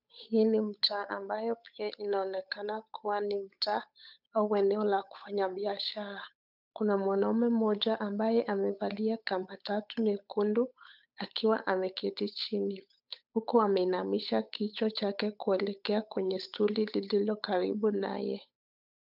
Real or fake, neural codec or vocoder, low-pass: fake; codec, 16 kHz, 8 kbps, FunCodec, trained on Chinese and English, 25 frames a second; 5.4 kHz